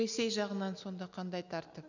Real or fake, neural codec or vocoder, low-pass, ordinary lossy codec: real; none; 7.2 kHz; none